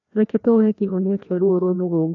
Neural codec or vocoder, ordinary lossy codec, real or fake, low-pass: codec, 16 kHz, 1 kbps, FreqCodec, larger model; none; fake; 7.2 kHz